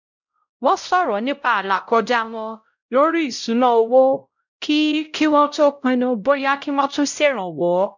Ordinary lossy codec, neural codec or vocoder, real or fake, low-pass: none; codec, 16 kHz, 0.5 kbps, X-Codec, WavLM features, trained on Multilingual LibriSpeech; fake; 7.2 kHz